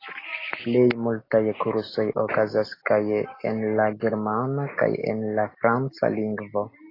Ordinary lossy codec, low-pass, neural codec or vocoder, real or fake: AAC, 24 kbps; 5.4 kHz; none; real